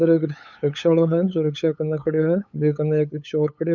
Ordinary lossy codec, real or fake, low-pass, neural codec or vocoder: none; fake; 7.2 kHz; codec, 16 kHz, 8 kbps, FunCodec, trained on LibriTTS, 25 frames a second